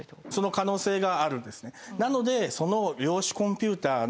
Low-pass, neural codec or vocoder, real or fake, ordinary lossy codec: none; none; real; none